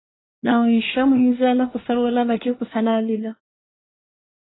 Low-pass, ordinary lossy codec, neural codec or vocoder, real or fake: 7.2 kHz; AAC, 16 kbps; codec, 24 kHz, 1 kbps, SNAC; fake